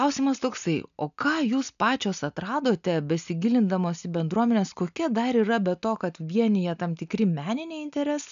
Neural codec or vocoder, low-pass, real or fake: none; 7.2 kHz; real